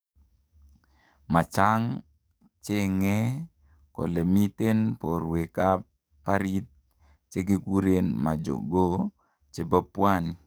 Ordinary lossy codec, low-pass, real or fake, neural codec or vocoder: none; none; fake; codec, 44.1 kHz, 7.8 kbps, DAC